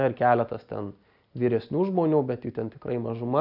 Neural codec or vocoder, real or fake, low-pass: none; real; 5.4 kHz